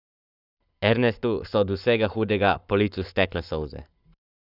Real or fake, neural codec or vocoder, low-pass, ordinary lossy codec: fake; codec, 44.1 kHz, 7.8 kbps, Pupu-Codec; 5.4 kHz; none